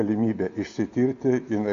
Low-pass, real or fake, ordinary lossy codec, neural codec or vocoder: 7.2 kHz; real; MP3, 64 kbps; none